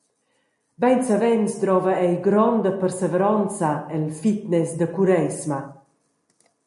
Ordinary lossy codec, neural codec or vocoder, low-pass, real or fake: MP3, 48 kbps; none; 14.4 kHz; real